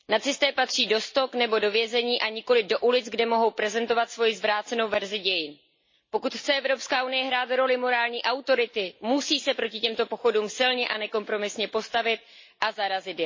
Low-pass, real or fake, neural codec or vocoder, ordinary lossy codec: 7.2 kHz; real; none; MP3, 32 kbps